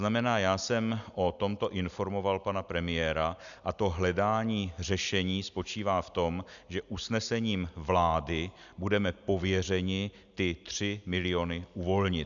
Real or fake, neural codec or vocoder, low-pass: real; none; 7.2 kHz